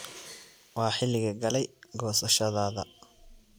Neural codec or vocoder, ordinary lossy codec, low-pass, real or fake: none; none; none; real